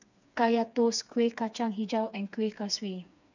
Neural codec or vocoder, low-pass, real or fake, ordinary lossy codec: codec, 16 kHz, 4 kbps, FreqCodec, smaller model; 7.2 kHz; fake; none